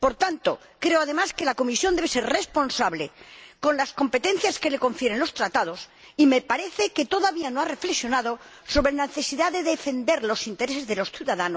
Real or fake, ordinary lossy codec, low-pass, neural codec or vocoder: real; none; none; none